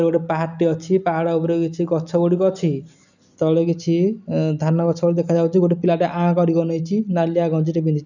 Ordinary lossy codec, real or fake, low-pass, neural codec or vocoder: none; real; 7.2 kHz; none